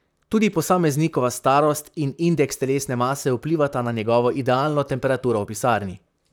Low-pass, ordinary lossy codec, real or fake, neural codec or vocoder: none; none; fake; vocoder, 44.1 kHz, 128 mel bands, Pupu-Vocoder